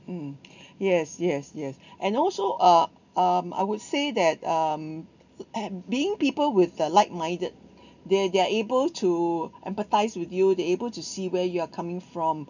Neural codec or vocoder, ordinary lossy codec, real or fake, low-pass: none; none; real; 7.2 kHz